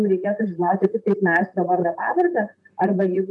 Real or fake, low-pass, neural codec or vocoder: fake; 10.8 kHz; vocoder, 44.1 kHz, 128 mel bands, Pupu-Vocoder